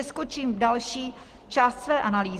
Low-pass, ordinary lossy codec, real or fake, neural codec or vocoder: 14.4 kHz; Opus, 16 kbps; real; none